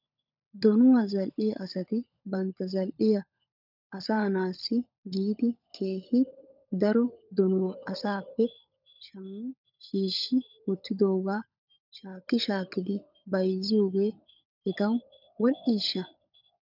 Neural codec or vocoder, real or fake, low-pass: codec, 16 kHz, 16 kbps, FunCodec, trained on LibriTTS, 50 frames a second; fake; 5.4 kHz